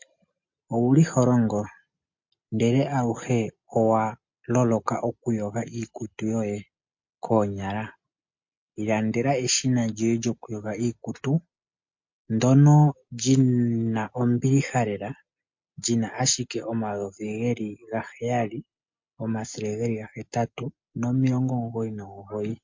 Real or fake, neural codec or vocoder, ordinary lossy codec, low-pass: real; none; MP3, 48 kbps; 7.2 kHz